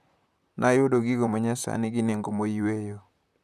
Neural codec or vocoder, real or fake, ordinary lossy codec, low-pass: vocoder, 44.1 kHz, 128 mel bands, Pupu-Vocoder; fake; none; 14.4 kHz